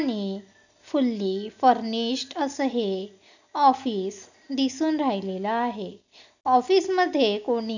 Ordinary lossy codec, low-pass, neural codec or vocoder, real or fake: none; 7.2 kHz; none; real